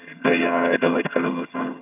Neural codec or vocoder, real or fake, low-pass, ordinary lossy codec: vocoder, 22.05 kHz, 80 mel bands, HiFi-GAN; fake; 3.6 kHz; none